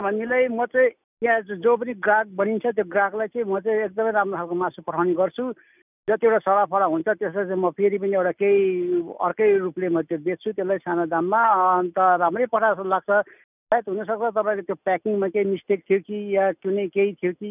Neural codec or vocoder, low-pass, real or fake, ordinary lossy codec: none; 3.6 kHz; real; none